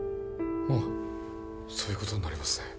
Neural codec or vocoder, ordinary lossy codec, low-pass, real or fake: none; none; none; real